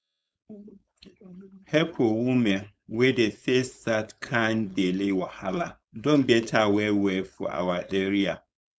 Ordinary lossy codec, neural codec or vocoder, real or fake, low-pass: none; codec, 16 kHz, 4.8 kbps, FACodec; fake; none